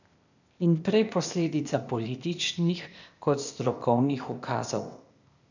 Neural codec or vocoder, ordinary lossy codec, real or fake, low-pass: codec, 16 kHz, 0.8 kbps, ZipCodec; none; fake; 7.2 kHz